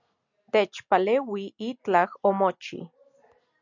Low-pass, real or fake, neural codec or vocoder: 7.2 kHz; real; none